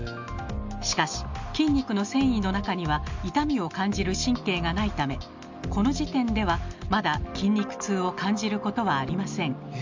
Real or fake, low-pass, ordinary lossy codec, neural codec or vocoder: real; 7.2 kHz; MP3, 64 kbps; none